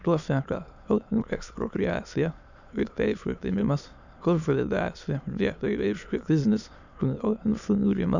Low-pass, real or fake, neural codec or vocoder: 7.2 kHz; fake; autoencoder, 22.05 kHz, a latent of 192 numbers a frame, VITS, trained on many speakers